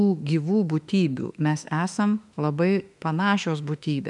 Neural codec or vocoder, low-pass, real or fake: autoencoder, 48 kHz, 32 numbers a frame, DAC-VAE, trained on Japanese speech; 10.8 kHz; fake